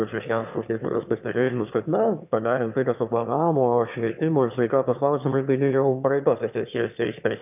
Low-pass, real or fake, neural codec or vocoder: 3.6 kHz; fake; autoencoder, 22.05 kHz, a latent of 192 numbers a frame, VITS, trained on one speaker